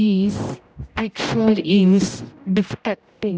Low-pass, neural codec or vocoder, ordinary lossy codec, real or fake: none; codec, 16 kHz, 0.5 kbps, X-Codec, HuBERT features, trained on general audio; none; fake